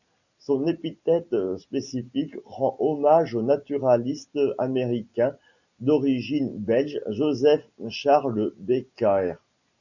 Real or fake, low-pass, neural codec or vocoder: real; 7.2 kHz; none